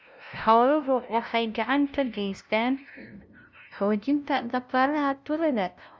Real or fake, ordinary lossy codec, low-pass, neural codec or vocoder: fake; none; none; codec, 16 kHz, 0.5 kbps, FunCodec, trained on LibriTTS, 25 frames a second